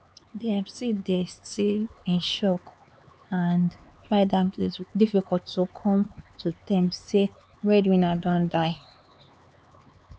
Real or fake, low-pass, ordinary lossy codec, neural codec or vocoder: fake; none; none; codec, 16 kHz, 4 kbps, X-Codec, HuBERT features, trained on LibriSpeech